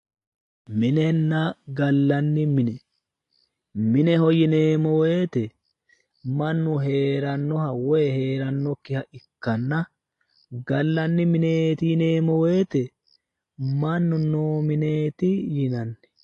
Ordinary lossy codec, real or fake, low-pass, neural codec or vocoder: AAC, 48 kbps; real; 10.8 kHz; none